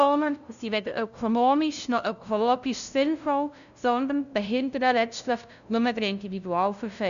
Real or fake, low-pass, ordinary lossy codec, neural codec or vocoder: fake; 7.2 kHz; none; codec, 16 kHz, 0.5 kbps, FunCodec, trained on LibriTTS, 25 frames a second